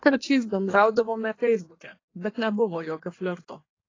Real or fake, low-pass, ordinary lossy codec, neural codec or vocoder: fake; 7.2 kHz; AAC, 32 kbps; codec, 16 kHz in and 24 kHz out, 1.1 kbps, FireRedTTS-2 codec